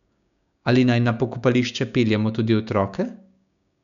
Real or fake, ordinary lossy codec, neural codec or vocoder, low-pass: fake; none; codec, 16 kHz, 6 kbps, DAC; 7.2 kHz